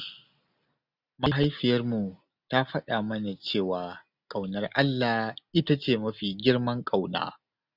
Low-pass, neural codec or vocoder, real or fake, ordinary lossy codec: 5.4 kHz; none; real; none